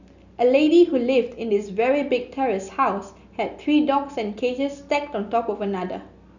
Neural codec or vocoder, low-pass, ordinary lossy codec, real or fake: none; 7.2 kHz; none; real